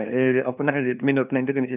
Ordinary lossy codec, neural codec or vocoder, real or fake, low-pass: none; codec, 16 kHz, 2 kbps, FunCodec, trained on LibriTTS, 25 frames a second; fake; 3.6 kHz